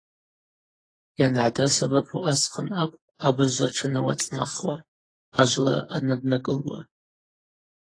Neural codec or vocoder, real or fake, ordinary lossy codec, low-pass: codec, 44.1 kHz, 2.6 kbps, SNAC; fake; AAC, 32 kbps; 9.9 kHz